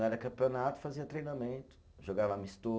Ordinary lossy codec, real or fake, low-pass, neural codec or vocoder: none; real; none; none